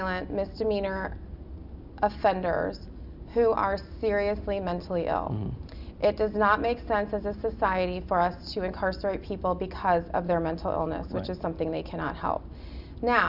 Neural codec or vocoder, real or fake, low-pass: none; real; 5.4 kHz